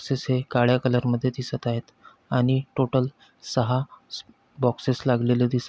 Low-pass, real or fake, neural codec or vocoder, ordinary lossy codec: none; real; none; none